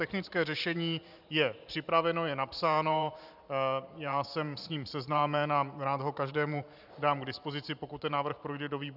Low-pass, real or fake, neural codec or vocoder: 5.4 kHz; fake; vocoder, 44.1 kHz, 128 mel bands every 512 samples, BigVGAN v2